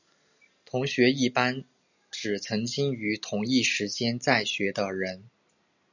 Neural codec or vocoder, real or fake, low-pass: none; real; 7.2 kHz